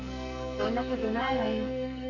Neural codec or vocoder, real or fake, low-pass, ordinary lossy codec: codec, 44.1 kHz, 2.6 kbps, SNAC; fake; 7.2 kHz; none